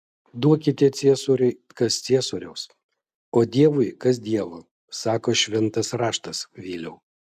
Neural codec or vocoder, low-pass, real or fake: none; 14.4 kHz; real